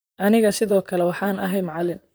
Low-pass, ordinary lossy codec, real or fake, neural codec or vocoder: none; none; fake; vocoder, 44.1 kHz, 128 mel bands, Pupu-Vocoder